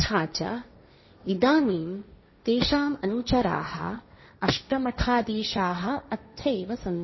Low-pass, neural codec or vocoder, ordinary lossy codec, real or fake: 7.2 kHz; codec, 16 kHz, 1.1 kbps, Voila-Tokenizer; MP3, 24 kbps; fake